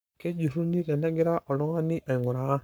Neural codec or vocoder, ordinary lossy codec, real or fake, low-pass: codec, 44.1 kHz, 7.8 kbps, Pupu-Codec; none; fake; none